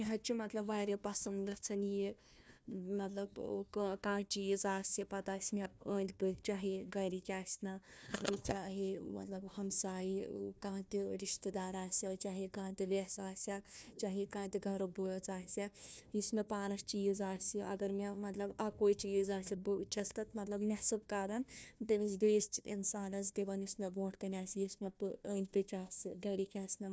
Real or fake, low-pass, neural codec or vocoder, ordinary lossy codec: fake; none; codec, 16 kHz, 1 kbps, FunCodec, trained on Chinese and English, 50 frames a second; none